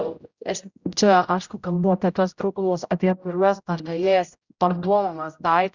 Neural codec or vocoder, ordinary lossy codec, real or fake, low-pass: codec, 16 kHz, 0.5 kbps, X-Codec, HuBERT features, trained on general audio; Opus, 64 kbps; fake; 7.2 kHz